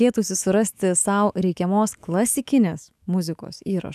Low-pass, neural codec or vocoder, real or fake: 14.4 kHz; autoencoder, 48 kHz, 128 numbers a frame, DAC-VAE, trained on Japanese speech; fake